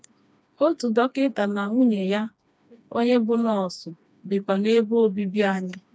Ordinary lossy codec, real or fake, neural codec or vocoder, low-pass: none; fake; codec, 16 kHz, 2 kbps, FreqCodec, smaller model; none